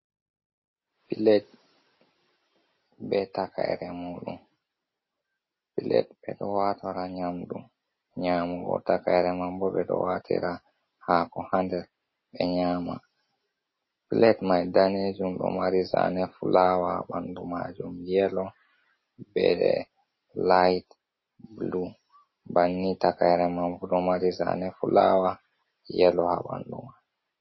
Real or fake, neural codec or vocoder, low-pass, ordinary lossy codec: real; none; 7.2 kHz; MP3, 24 kbps